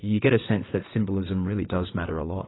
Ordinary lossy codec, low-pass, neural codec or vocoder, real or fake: AAC, 16 kbps; 7.2 kHz; vocoder, 22.05 kHz, 80 mel bands, WaveNeXt; fake